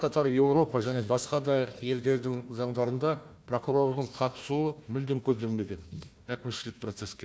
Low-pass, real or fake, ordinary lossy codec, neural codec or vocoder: none; fake; none; codec, 16 kHz, 1 kbps, FunCodec, trained on Chinese and English, 50 frames a second